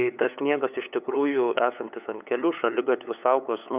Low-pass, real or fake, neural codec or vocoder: 3.6 kHz; fake; codec, 16 kHz, 8 kbps, FunCodec, trained on LibriTTS, 25 frames a second